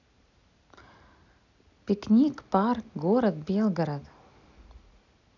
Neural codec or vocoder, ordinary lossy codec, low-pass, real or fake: none; none; 7.2 kHz; real